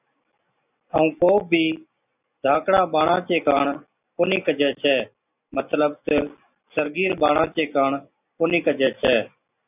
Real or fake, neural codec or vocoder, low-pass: real; none; 3.6 kHz